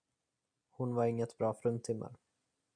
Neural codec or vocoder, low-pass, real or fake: none; 9.9 kHz; real